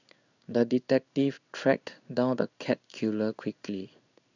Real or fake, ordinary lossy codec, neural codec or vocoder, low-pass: fake; none; codec, 16 kHz in and 24 kHz out, 1 kbps, XY-Tokenizer; 7.2 kHz